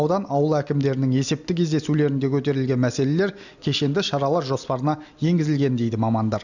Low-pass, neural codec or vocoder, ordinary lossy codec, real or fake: 7.2 kHz; none; none; real